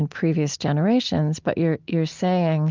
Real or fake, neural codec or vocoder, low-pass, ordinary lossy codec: real; none; 7.2 kHz; Opus, 32 kbps